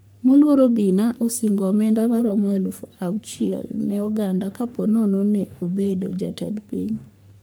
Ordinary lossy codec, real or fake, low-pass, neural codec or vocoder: none; fake; none; codec, 44.1 kHz, 3.4 kbps, Pupu-Codec